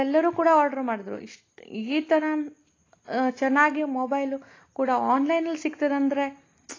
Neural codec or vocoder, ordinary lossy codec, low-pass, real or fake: none; AAC, 32 kbps; 7.2 kHz; real